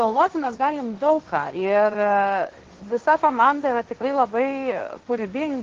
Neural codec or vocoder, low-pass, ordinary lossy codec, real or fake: codec, 16 kHz, 1.1 kbps, Voila-Tokenizer; 7.2 kHz; Opus, 16 kbps; fake